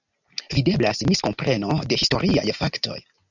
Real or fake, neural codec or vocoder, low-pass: real; none; 7.2 kHz